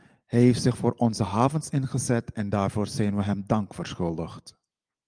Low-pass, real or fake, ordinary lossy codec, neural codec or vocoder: 9.9 kHz; real; Opus, 32 kbps; none